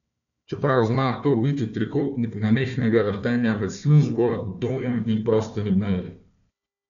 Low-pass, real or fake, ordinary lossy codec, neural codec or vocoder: 7.2 kHz; fake; none; codec, 16 kHz, 1 kbps, FunCodec, trained on Chinese and English, 50 frames a second